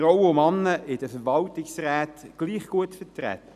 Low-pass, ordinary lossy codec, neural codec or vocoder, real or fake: 14.4 kHz; none; none; real